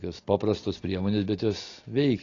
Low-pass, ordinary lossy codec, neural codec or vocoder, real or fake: 7.2 kHz; AAC, 32 kbps; none; real